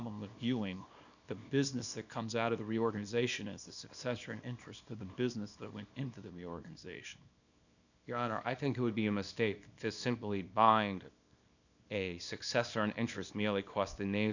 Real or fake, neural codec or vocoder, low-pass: fake; codec, 24 kHz, 0.9 kbps, WavTokenizer, small release; 7.2 kHz